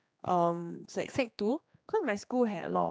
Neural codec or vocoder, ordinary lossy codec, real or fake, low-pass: codec, 16 kHz, 2 kbps, X-Codec, HuBERT features, trained on general audio; none; fake; none